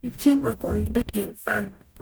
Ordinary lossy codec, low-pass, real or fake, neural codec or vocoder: none; none; fake; codec, 44.1 kHz, 0.9 kbps, DAC